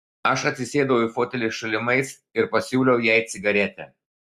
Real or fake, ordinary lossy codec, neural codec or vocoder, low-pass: real; Opus, 64 kbps; none; 14.4 kHz